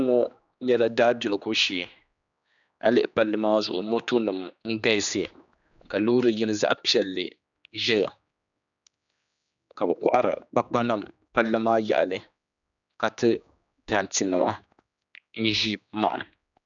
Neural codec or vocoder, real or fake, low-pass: codec, 16 kHz, 2 kbps, X-Codec, HuBERT features, trained on general audio; fake; 7.2 kHz